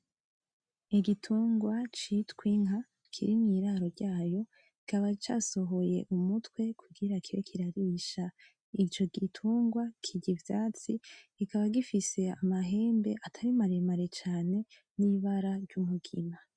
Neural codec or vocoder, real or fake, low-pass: none; real; 9.9 kHz